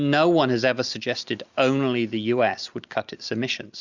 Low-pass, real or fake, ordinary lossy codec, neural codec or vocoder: 7.2 kHz; real; Opus, 64 kbps; none